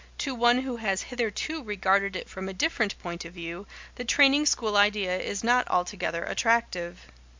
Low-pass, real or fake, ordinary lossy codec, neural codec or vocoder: 7.2 kHz; real; MP3, 64 kbps; none